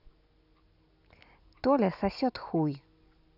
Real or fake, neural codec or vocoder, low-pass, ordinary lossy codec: real; none; 5.4 kHz; none